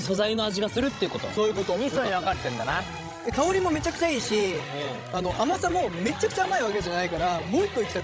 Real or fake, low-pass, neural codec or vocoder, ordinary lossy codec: fake; none; codec, 16 kHz, 16 kbps, FreqCodec, larger model; none